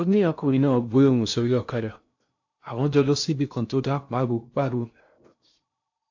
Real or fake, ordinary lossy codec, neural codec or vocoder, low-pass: fake; AAC, 48 kbps; codec, 16 kHz in and 24 kHz out, 0.6 kbps, FocalCodec, streaming, 2048 codes; 7.2 kHz